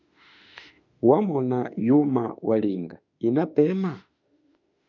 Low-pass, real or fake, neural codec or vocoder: 7.2 kHz; fake; autoencoder, 48 kHz, 32 numbers a frame, DAC-VAE, trained on Japanese speech